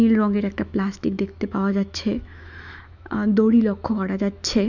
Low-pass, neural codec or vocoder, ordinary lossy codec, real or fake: 7.2 kHz; autoencoder, 48 kHz, 128 numbers a frame, DAC-VAE, trained on Japanese speech; none; fake